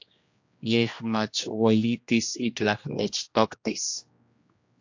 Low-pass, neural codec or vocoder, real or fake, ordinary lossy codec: 7.2 kHz; codec, 16 kHz, 1 kbps, X-Codec, HuBERT features, trained on general audio; fake; AAC, 48 kbps